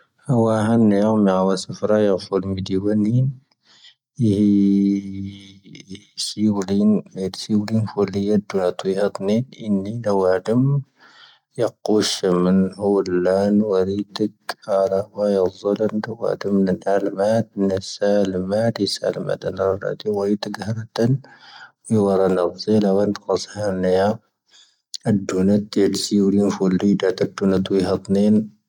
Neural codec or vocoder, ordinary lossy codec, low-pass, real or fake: none; none; 19.8 kHz; real